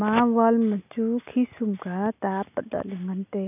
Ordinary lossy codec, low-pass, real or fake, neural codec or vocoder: none; 3.6 kHz; real; none